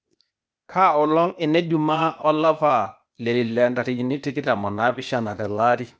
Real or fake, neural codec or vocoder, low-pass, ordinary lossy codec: fake; codec, 16 kHz, 0.8 kbps, ZipCodec; none; none